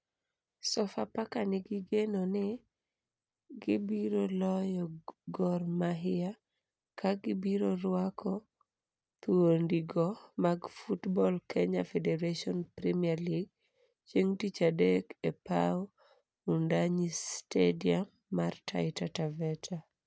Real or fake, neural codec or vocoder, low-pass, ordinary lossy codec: real; none; none; none